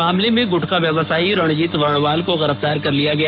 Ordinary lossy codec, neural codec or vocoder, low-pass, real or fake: none; codec, 44.1 kHz, 7.8 kbps, Pupu-Codec; 5.4 kHz; fake